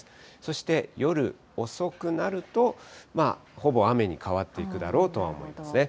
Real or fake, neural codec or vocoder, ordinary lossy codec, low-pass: real; none; none; none